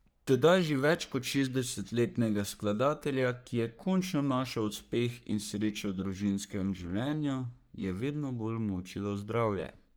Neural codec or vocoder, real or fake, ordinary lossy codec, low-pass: codec, 44.1 kHz, 3.4 kbps, Pupu-Codec; fake; none; none